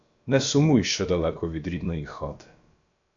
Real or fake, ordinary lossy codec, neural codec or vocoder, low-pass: fake; AAC, 48 kbps; codec, 16 kHz, about 1 kbps, DyCAST, with the encoder's durations; 7.2 kHz